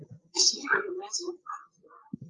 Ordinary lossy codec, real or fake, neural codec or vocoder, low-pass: Opus, 32 kbps; fake; codec, 16 kHz, 16 kbps, FreqCodec, larger model; 7.2 kHz